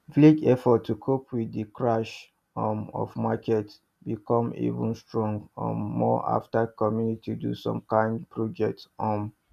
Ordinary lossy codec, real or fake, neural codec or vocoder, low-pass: none; real; none; 14.4 kHz